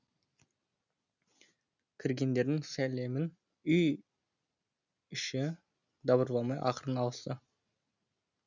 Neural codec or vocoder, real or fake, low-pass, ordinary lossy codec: none; real; 7.2 kHz; none